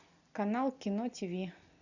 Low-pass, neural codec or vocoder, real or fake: 7.2 kHz; none; real